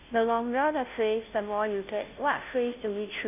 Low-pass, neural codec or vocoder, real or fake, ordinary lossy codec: 3.6 kHz; codec, 16 kHz, 0.5 kbps, FunCodec, trained on Chinese and English, 25 frames a second; fake; none